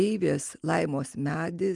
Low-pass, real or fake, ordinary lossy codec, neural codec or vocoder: 10.8 kHz; real; Opus, 32 kbps; none